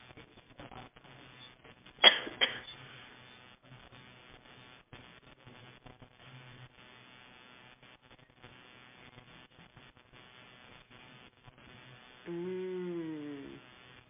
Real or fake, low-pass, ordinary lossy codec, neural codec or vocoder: real; 3.6 kHz; MP3, 32 kbps; none